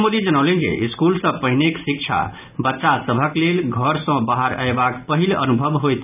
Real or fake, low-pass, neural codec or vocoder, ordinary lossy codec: real; 3.6 kHz; none; none